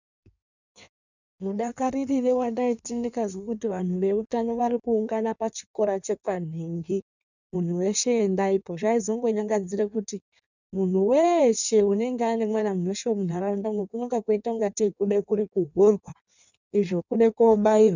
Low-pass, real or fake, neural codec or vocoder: 7.2 kHz; fake; codec, 16 kHz in and 24 kHz out, 1.1 kbps, FireRedTTS-2 codec